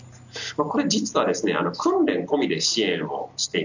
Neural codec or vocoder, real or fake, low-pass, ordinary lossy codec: none; real; 7.2 kHz; none